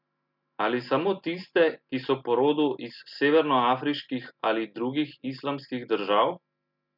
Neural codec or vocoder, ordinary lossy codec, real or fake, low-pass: none; none; real; 5.4 kHz